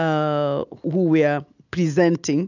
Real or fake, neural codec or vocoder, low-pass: real; none; 7.2 kHz